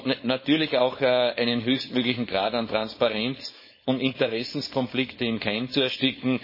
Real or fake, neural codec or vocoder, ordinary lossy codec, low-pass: fake; codec, 16 kHz, 4.8 kbps, FACodec; MP3, 24 kbps; 5.4 kHz